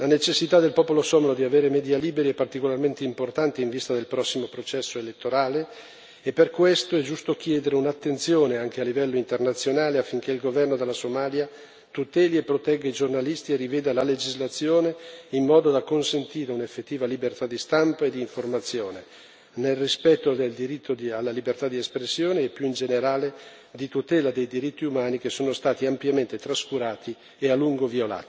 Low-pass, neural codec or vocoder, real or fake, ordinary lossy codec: none; none; real; none